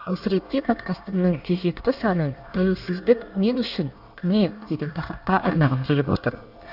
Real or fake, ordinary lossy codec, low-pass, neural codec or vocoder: fake; none; 5.4 kHz; codec, 24 kHz, 1 kbps, SNAC